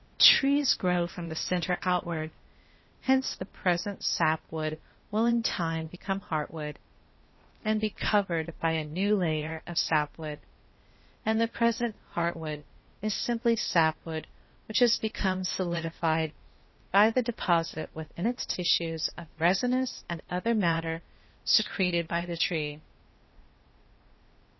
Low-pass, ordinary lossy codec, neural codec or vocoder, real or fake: 7.2 kHz; MP3, 24 kbps; codec, 16 kHz, 0.8 kbps, ZipCodec; fake